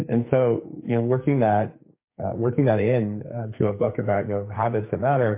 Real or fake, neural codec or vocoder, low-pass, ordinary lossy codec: fake; codec, 44.1 kHz, 2.6 kbps, SNAC; 3.6 kHz; MP3, 24 kbps